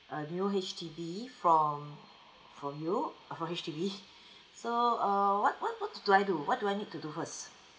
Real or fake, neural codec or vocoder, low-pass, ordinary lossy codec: real; none; none; none